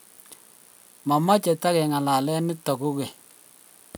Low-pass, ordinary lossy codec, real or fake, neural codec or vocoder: none; none; real; none